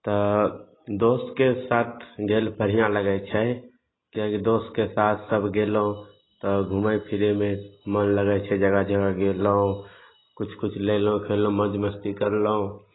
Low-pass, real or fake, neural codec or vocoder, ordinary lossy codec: 7.2 kHz; real; none; AAC, 16 kbps